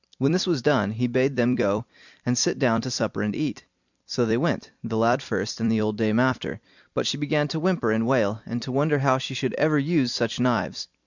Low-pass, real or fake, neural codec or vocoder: 7.2 kHz; fake; vocoder, 44.1 kHz, 128 mel bands every 256 samples, BigVGAN v2